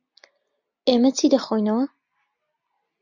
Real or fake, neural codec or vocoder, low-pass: real; none; 7.2 kHz